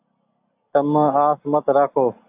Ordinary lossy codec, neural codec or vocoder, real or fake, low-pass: AAC, 32 kbps; none; real; 3.6 kHz